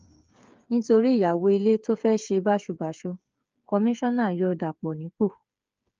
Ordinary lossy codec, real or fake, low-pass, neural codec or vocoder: Opus, 24 kbps; fake; 7.2 kHz; codec, 16 kHz, 8 kbps, FreqCodec, smaller model